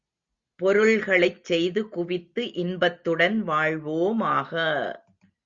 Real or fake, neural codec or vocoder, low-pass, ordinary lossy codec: real; none; 7.2 kHz; Opus, 64 kbps